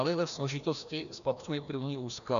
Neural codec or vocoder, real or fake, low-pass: codec, 16 kHz, 1 kbps, FreqCodec, larger model; fake; 7.2 kHz